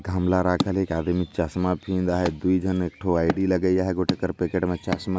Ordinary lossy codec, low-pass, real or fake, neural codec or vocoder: none; none; real; none